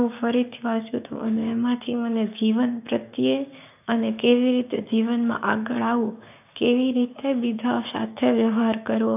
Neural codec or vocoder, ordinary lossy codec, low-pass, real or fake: codec, 16 kHz, 6 kbps, DAC; none; 3.6 kHz; fake